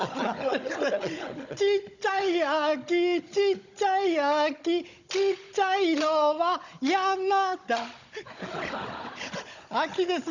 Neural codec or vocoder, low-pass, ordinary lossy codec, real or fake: codec, 16 kHz, 16 kbps, FunCodec, trained on Chinese and English, 50 frames a second; 7.2 kHz; none; fake